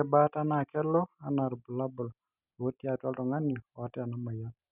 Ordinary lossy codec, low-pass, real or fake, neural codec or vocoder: none; 3.6 kHz; real; none